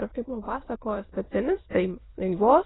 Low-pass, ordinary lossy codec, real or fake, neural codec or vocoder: 7.2 kHz; AAC, 16 kbps; fake; autoencoder, 22.05 kHz, a latent of 192 numbers a frame, VITS, trained on many speakers